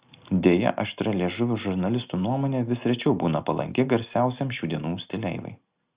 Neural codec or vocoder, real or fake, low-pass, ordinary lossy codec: none; real; 3.6 kHz; Opus, 64 kbps